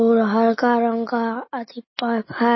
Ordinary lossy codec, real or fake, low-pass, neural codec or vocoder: MP3, 24 kbps; real; 7.2 kHz; none